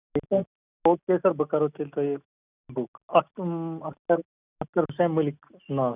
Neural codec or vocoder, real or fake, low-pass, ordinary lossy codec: none; real; 3.6 kHz; none